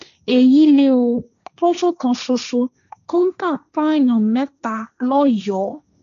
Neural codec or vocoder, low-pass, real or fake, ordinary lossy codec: codec, 16 kHz, 1.1 kbps, Voila-Tokenizer; 7.2 kHz; fake; none